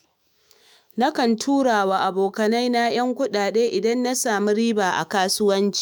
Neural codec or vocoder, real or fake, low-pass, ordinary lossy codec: autoencoder, 48 kHz, 128 numbers a frame, DAC-VAE, trained on Japanese speech; fake; none; none